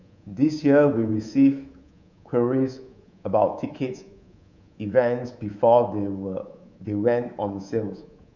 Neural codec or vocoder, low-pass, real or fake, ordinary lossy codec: codec, 24 kHz, 3.1 kbps, DualCodec; 7.2 kHz; fake; none